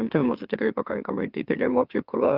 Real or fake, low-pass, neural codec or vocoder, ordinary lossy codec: fake; 5.4 kHz; autoencoder, 44.1 kHz, a latent of 192 numbers a frame, MeloTTS; Opus, 24 kbps